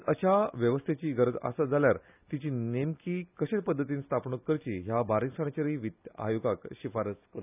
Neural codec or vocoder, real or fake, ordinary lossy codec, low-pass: none; real; none; 3.6 kHz